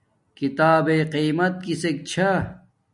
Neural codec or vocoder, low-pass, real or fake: none; 10.8 kHz; real